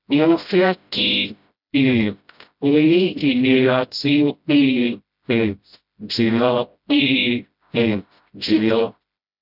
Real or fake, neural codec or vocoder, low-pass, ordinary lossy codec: fake; codec, 16 kHz, 0.5 kbps, FreqCodec, smaller model; 5.4 kHz; none